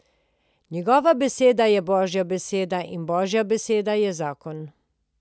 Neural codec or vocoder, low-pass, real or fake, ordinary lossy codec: none; none; real; none